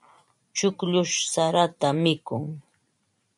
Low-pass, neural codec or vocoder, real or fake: 10.8 kHz; vocoder, 44.1 kHz, 128 mel bands every 512 samples, BigVGAN v2; fake